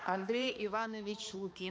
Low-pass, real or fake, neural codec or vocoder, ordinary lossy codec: none; fake; codec, 16 kHz, 2 kbps, X-Codec, HuBERT features, trained on balanced general audio; none